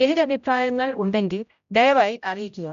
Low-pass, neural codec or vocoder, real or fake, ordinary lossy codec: 7.2 kHz; codec, 16 kHz, 0.5 kbps, X-Codec, HuBERT features, trained on general audio; fake; none